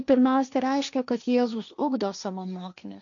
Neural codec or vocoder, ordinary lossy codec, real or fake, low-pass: codec, 16 kHz, 1.1 kbps, Voila-Tokenizer; MP3, 96 kbps; fake; 7.2 kHz